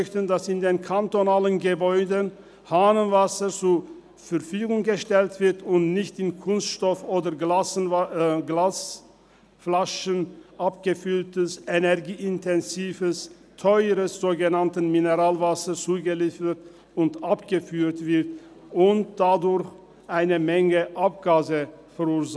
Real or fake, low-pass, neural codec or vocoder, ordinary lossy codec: real; none; none; none